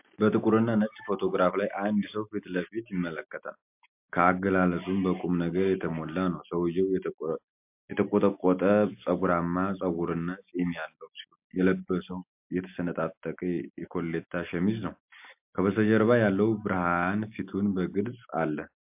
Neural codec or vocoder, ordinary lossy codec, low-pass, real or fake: none; MP3, 32 kbps; 3.6 kHz; real